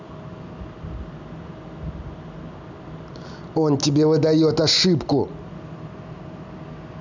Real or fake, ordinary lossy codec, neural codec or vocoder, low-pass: real; none; none; 7.2 kHz